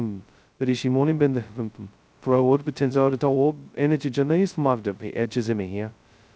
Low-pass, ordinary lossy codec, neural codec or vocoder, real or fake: none; none; codec, 16 kHz, 0.2 kbps, FocalCodec; fake